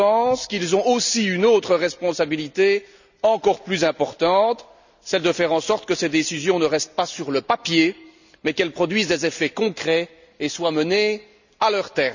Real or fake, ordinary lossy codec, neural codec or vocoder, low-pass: real; none; none; 7.2 kHz